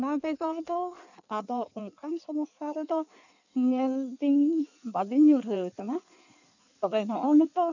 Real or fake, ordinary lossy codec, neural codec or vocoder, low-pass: fake; none; codec, 16 kHz in and 24 kHz out, 1.1 kbps, FireRedTTS-2 codec; 7.2 kHz